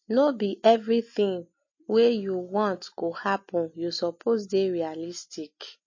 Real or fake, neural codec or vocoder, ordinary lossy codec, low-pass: real; none; MP3, 32 kbps; 7.2 kHz